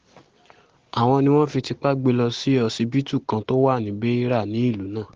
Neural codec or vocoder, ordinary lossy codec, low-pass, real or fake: none; Opus, 16 kbps; 7.2 kHz; real